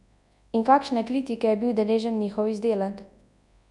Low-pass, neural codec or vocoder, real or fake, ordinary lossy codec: 10.8 kHz; codec, 24 kHz, 0.9 kbps, WavTokenizer, large speech release; fake; none